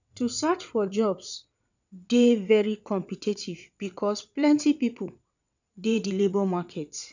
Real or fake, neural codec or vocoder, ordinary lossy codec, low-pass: fake; vocoder, 22.05 kHz, 80 mel bands, WaveNeXt; none; 7.2 kHz